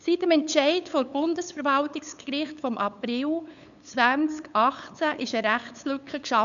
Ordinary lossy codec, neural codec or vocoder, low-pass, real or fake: none; codec, 16 kHz, 8 kbps, FunCodec, trained on LibriTTS, 25 frames a second; 7.2 kHz; fake